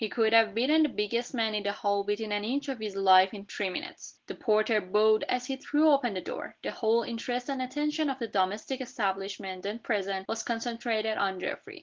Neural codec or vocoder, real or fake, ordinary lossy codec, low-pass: none; real; Opus, 16 kbps; 7.2 kHz